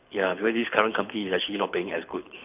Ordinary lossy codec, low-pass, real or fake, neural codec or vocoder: none; 3.6 kHz; fake; codec, 24 kHz, 6 kbps, HILCodec